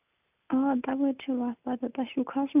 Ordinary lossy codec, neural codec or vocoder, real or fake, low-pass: none; none; real; 3.6 kHz